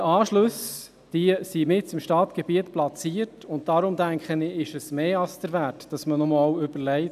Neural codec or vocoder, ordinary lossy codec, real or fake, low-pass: none; none; real; 14.4 kHz